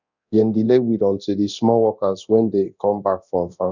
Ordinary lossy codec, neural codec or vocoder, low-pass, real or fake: none; codec, 24 kHz, 0.9 kbps, DualCodec; 7.2 kHz; fake